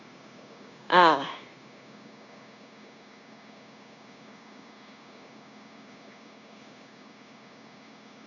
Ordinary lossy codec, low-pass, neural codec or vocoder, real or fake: none; 7.2 kHz; codec, 16 kHz, 0.9 kbps, LongCat-Audio-Codec; fake